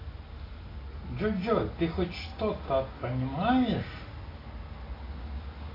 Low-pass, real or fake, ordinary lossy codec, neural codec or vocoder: 5.4 kHz; real; AAC, 24 kbps; none